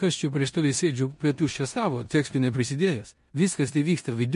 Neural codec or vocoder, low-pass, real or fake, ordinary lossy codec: codec, 16 kHz in and 24 kHz out, 0.9 kbps, LongCat-Audio-Codec, four codebook decoder; 10.8 kHz; fake; MP3, 48 kbps